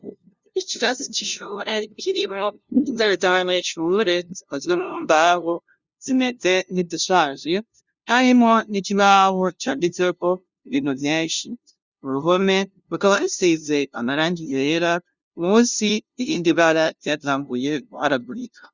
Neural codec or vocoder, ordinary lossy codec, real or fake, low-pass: codec, 16 kHz, 0.5 kbps, FunCodec, trained on LibriTTS, 25 frames a second; Opus, 64 kbps; fake; 7.2 kHz